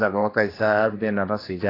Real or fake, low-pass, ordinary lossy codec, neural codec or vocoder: fake; 5.4 kHz; AAC, 24 kbps; codec, 16 kHz, 2 kbps, X-Codec, HuBERT features, trained on general audio